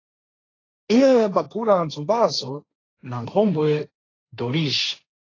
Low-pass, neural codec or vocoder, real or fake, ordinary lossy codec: 7.2 kHz; codec, 16 kHz, 1.1 kbps, Voila-Tokenizer; fake; AAC, 32 kbps